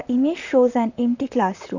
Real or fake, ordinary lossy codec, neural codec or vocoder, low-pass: fake; none; vocoder, 44.1 kHz, 128 mel bands, Pupu-Vocoder; 7.2 kHz